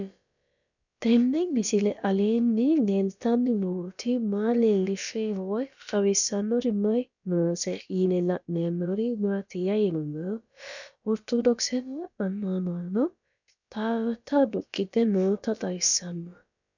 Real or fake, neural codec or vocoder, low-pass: fake; codec, 16 kHz, about 1 kbps, DyCAST, with the encoder's durations; 7.2 kHz